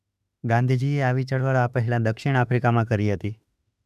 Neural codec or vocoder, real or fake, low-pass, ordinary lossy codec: autoencoder, 48 kHz, 32 numbers a frame, DAC-VAE, trained on Japanese speech; fake; 14.4 kHz; none